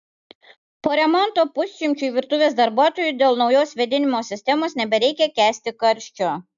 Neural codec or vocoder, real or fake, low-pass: none; real; 7.2 kHz